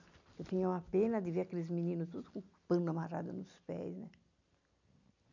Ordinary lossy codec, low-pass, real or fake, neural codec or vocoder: none; 7.2 kHz; real; none